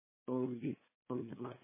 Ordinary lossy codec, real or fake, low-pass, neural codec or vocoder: MP3, 16 kbps; fake; 3.6 kHz; codec, 16 kHz, 2 kbps, FunCodec, trained on LibriTTS, 25 frames a second